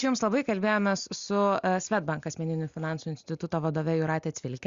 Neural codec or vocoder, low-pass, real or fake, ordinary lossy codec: none; 7.2 kHz; real; Opus, 64 kbps